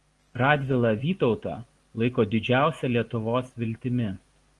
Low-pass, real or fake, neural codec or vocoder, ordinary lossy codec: 10.8 kHz; real; none; Opus, 24 kbps